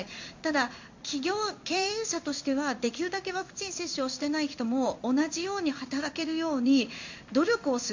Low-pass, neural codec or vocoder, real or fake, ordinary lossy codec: 7.2 kHz; codec, 16 kHz in and 24 kHz out, 1 kbps, XY-Tokenizer; fake; MP3, 48 kbps